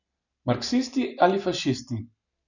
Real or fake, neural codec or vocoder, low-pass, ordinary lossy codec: real; none; 7.2 kHz; none